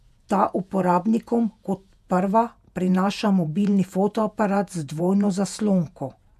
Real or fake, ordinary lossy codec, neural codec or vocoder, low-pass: fake; none; vocoder, 48 kHz, 128 mel bands, Vocos; 14.4 kHz